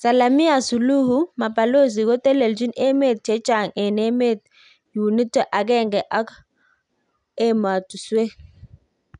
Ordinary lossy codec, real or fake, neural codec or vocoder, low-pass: none; real; none; 10.8 kHz